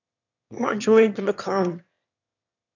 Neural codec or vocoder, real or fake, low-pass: autoencoder, 22.05 kHz, a latent of 192 numbers a frame, VITS, trained on one speaker; fake; 7.2 kHz